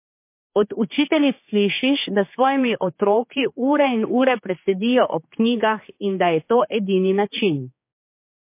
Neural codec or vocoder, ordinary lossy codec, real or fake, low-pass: codec, 16 kHz, 4 kbps, X-Codec, HuBERT features, trained on general audio; MP3, 24 kbps; fake; 3.6 kHz